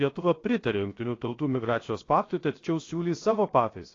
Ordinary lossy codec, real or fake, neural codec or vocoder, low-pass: AAC, 32 kbps; fake; codec, 16 kHz, 0.7 kbps, FocalCodec; 7.2 kHz